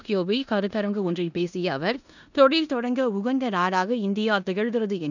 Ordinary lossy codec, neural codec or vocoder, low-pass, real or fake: none; codec, 16 kHz in and 24 kHz out, 0.9 kbps, LongCat-Audio-Codec, four codebook decoder; 7.2 kHz; fake